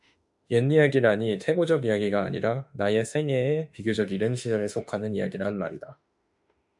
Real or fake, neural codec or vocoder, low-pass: fake; autoencoder, 48 kHz, 32 numbers a frame, DAC-VAE, trained on Japanese speech; 10.8 kHz